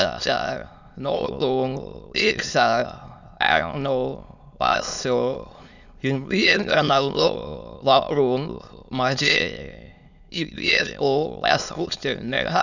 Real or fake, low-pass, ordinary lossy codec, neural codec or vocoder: fake; 7.2 kHz; none; autoencoder, 22.05 kHz, a latent of 192 numbers a frame, VITS, trained on many speakers